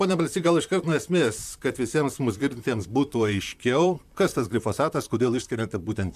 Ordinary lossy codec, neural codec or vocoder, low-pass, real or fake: MP3, 96 kbps; vocoder, 44.1 kHz, 128 mel bands, Pupu-Vocoder; 14.4 kHz; fake